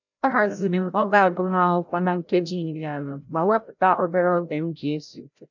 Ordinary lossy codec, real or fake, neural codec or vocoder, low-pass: none; fake; codec, 16 kHz, 0.5 kbps, FreqCodec, larger model; 7.2 kHz